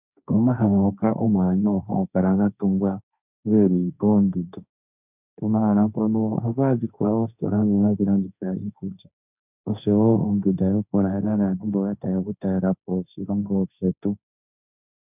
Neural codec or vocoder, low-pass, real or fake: codec, 16 kHz, 1.1 kbps, Voila-Tokenizer; 3.6 kHz; fake